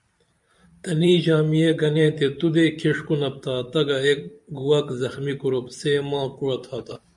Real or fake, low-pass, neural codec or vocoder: fake; 10.8 kHz; vocoder, 44.1 kHz, 128 mel bands every 512 samples, BigVGAN v2